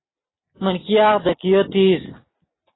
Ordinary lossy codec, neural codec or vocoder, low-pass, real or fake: AAC, 16 kbps; none; 7.2 kHz; real